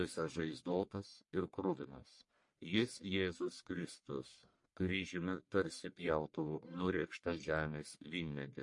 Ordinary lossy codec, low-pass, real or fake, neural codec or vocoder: MP3, 48 kbps; 10.8 kHz; fake; codec, 44.1 kHz, 1.7 kbps, Pupu-Codec